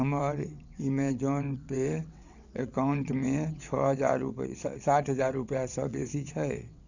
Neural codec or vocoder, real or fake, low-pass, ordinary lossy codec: vocoder, 22.05 kHz, 80 mel bands, WaveNeXt; fake; 7.2 kHz; none